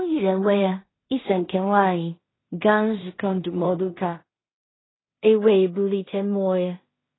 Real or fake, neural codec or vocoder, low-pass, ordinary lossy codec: fake; codec, 16 kHz in and 24 kHz out, 0.4 kbps, LongCat-Audio-Codec, two codebook decoder; 7.2 kHz; AAC, 16 kbps